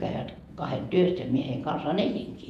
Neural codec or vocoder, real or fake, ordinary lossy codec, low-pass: vocoder, 48 kHz, 128 mel bands, Vocos; fake; none; 14.4 kHz